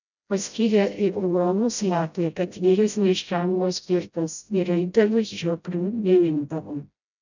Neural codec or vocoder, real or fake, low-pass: codec, 16 kHz, 0.5 kbps, FreqCodec, smaller model; fake; 7.2 kHz